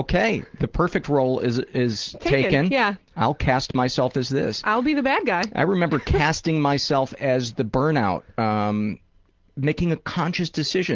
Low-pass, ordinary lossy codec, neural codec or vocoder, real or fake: 7.2 kHz; Opus, 16 kbps; none; real